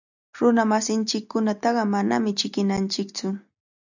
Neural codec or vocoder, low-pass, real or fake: none; 7.2 kHz; real